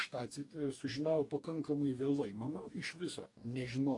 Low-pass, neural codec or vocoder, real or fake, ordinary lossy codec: 10.8 kHz; codec, 44.1 kHz, 2.6 kbps, DAC; fake; AAC, 48 kbps